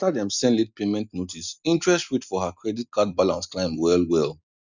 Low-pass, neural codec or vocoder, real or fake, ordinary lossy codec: 7.2 kHz; none; real; none